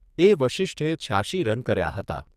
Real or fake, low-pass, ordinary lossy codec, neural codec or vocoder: fake; 14.4 kHz; none; codec, 44.1 kHz, 2.6 kbps, SNAC